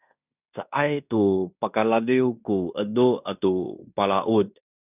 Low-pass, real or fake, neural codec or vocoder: 3.6 kHz; fake; codec, 16 kHz in and 24 kHz out, 0.9 kbps, LongCat-Audio-Codec, fine tuned four codebook decoder